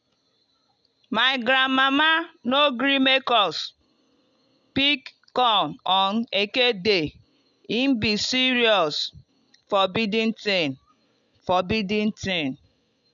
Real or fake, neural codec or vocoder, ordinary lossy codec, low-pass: real; none; none; 7.2 kHz